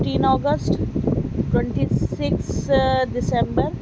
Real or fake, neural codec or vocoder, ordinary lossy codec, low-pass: real; none; none; none